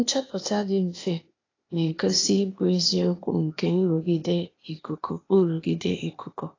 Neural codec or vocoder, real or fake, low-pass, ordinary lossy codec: codec, 16 kHz, 0.8 kbps, ZipCodec; fake; 7.2 kHz; AAC, 32 kbps